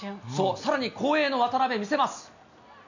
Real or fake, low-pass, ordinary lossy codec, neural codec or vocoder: real; 7.2 kHz; none; none